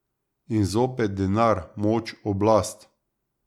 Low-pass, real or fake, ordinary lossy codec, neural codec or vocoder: 19.8 kHz; real; none; none